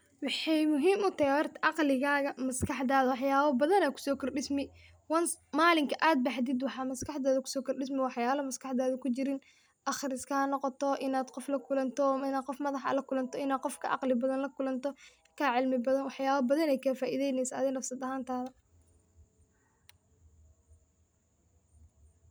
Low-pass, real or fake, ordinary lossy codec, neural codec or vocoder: none; real; none; none